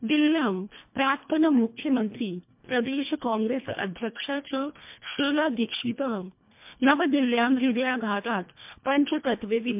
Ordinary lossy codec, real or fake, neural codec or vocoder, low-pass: MP3, 32 kbps; fake; codec, 24 kHz, 1.5 kbps, HILCodec; 3.6 kHz